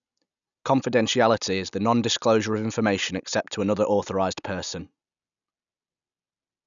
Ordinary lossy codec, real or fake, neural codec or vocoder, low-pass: none; real; none; 7.2 kHz